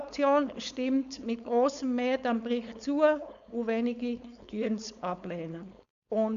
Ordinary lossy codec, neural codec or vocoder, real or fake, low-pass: none; codec, 16 kHz, 4.8 kbps, FACodec; fake; 7.2 kHz